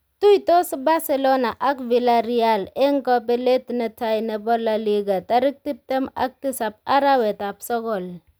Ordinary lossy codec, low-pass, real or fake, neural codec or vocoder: none; none; real; none